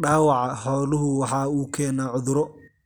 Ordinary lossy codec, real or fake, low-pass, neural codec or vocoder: none; real; none; none